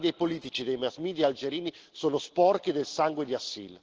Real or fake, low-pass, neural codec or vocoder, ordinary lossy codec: real; 7.2 kHz; none; Opus, 16 kbps